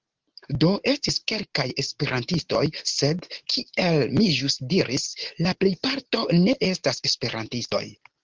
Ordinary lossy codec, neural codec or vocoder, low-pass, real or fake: Opus, 16 kbps; none; 7.2 kHz; real